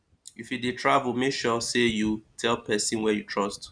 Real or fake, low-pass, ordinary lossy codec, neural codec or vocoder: real; 9.9 kHz; none; none